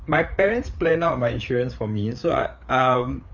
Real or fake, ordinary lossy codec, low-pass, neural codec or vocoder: fake; none; 7.2 kHz; codec, 16 kHz, 4 kbps, FreqCodec, larger model